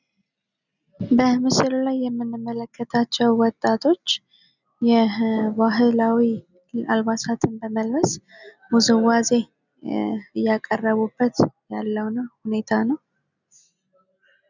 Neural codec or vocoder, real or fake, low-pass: none; real; 7.2 kHz